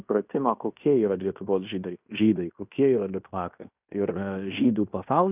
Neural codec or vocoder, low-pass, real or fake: codec, 16 kHz in and 24 kHz out, 0.9 kbps, LongCat-Audio-Codec, fine tuned four codebook decoder; 3.6 kHz; fake